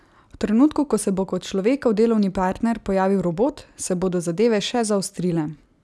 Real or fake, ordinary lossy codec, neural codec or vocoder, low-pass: real; none; none; none